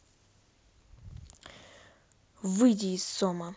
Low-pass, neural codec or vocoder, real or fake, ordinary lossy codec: none; none; real; none